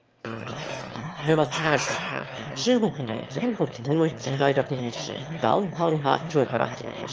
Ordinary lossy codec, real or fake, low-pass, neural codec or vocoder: Opus, 24 kbps; fake; 7.2 kHz; autoencoder, 22.05 kHz, a latent of 192 numbers a frame, VITS, trained on one speaker